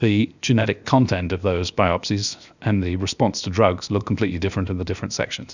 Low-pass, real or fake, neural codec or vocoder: 7.2 kHz; fake; codec, 16 kHz, 0.7 kbps, FocalCodec